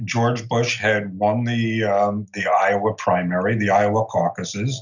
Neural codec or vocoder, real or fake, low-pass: none; real; 7.2 kHz